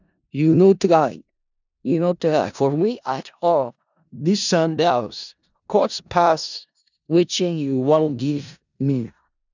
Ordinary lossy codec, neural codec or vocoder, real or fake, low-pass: none; codec, 16 kHz in and 24 kHz out, 0.4 kbps, LongCat-Audio-Codec, four codebook decoder; fake; 7.2 kHz